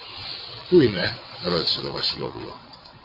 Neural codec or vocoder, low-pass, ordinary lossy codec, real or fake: vocoder, 22.05 kHz, 80 mel bands, WaveNeXt; 5.4 kHz; MP3, 32 kbps; fake